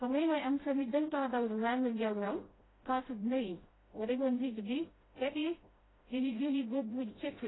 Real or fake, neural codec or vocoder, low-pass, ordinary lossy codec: fake; codec, 16 kHz, 0.5 kbps, FreqCodec, smaller model; 7.2 kHz; AAC, 16 kbps